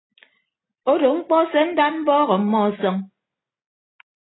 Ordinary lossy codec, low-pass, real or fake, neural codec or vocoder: AAC, 16 kbps; 7.2 kHz; real; none